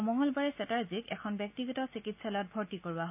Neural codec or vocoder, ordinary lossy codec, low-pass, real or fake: none; AAC, 32 kbps; 3.6 kHz; real